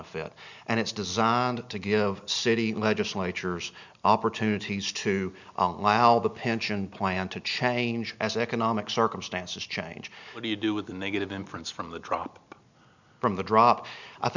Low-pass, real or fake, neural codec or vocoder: 7.2 kHz; real; none